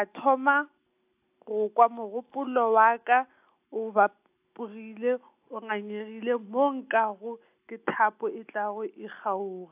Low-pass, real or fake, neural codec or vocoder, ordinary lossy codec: 3.6 kHz; real; none; AAC, 32 kbps